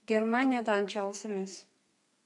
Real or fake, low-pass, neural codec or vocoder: fake; 10.8 kHz; codec, 32 kHz, 1.9 kbps, SNAC